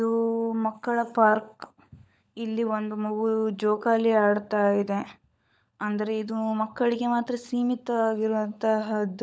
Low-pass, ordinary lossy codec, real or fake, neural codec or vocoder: none; none; fake; codec, 16 kHz, 16 kbps, FunCodec, trained on LibriTTS, 50 frames a second